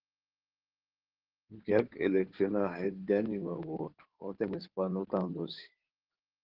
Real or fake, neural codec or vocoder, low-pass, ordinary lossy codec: fake; codec, 16 kHz in and 24 kHz out, 2.2 kbps, FireRedTTS-2 codec; 5.4 kHz; Opus, 16 kbps